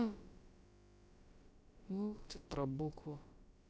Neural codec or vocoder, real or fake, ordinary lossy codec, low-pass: codec, 16 kHz, about 1 kbps, DyCAST, with the encoder's durations; fake; none; none